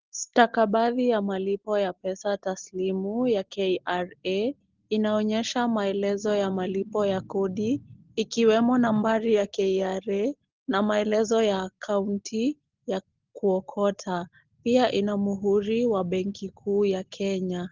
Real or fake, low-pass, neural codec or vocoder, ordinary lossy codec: real; 7.2 kHz; none; Opus, 16 kbps